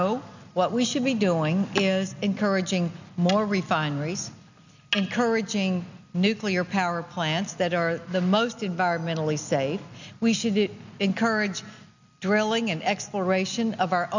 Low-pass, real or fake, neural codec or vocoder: 7.2 kHz; real; none